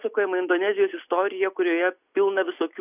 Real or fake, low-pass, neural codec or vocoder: real; 3.6 kHz; none